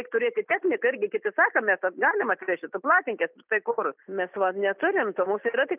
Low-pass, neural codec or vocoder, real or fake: 3.6 kHz; none; real